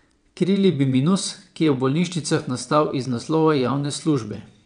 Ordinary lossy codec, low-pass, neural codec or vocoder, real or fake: none; 9.9 kHz; vocoder, 22.05 kHz, 80 mel bands, WaveNeXt; fake